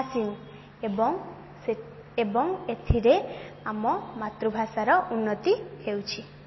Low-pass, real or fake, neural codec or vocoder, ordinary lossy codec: 7.2 kHz; real; none; MP3, 24 kbps